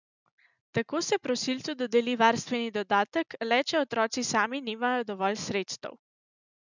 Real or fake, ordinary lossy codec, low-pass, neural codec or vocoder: real; none; 7.2 kHz; none